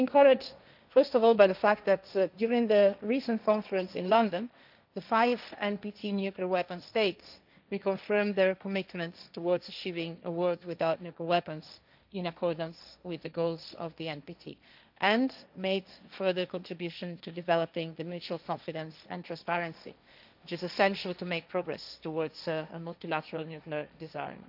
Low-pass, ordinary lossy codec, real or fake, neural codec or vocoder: 5.4 kHz; none; fake; codec, 16 kHz, 1.1 kbps, Voila-Tokenizer